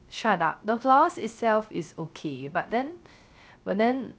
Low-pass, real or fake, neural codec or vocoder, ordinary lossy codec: none; fake; codec, 16 kHz, 0.3 kbps, FocalCodec; none